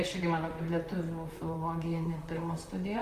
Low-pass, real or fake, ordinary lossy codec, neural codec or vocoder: 14.4 kHz; fake; Opus, 32 kbps; vocoder, 44.1 kHz, 128 mel bands, Pupu-Vocoder